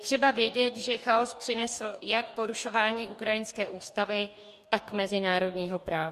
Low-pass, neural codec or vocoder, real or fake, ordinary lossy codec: 14.4 kHz; codec, 44.1 kHz, 2.6 kbps, DAC; fake; MP3, 64 kbps